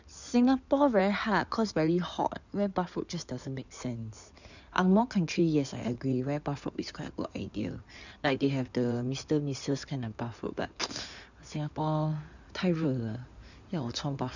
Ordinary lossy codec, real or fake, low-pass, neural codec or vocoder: none; fake; 7.2 kHz; codec, 16 kHz in and 24 kHz out, 2.2 kbps, FireRedTTS-2 codec